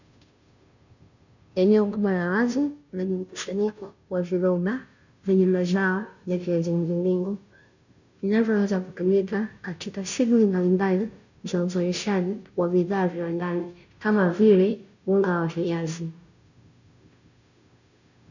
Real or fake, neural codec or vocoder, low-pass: fake; codec, 16 kHz, 0.5 kbps, FunCodec, trained on Chinese and English, 25 frames a second; 7.2 kHz